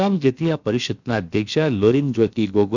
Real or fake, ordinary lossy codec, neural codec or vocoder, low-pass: fake; none; codec, 16 kHz, about 1 kbps, DyCAST, with the encoder's durations; 7.2 kHz